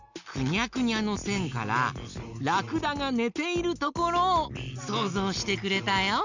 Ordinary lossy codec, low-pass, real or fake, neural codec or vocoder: none; 7.2 kHz; real; none